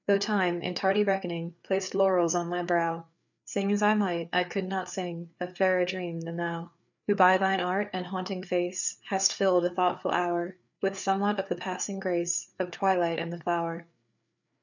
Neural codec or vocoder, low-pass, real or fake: codec, 16 kHz, 4 kbps, FreqCodec, larger model; 7.2 kHz; fake